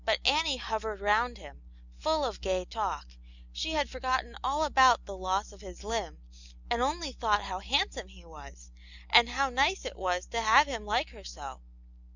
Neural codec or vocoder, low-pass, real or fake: none; 7.2 kHz; real